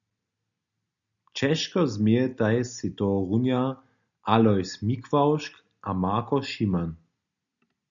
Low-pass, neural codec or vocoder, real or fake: 7.2 kHz; none; real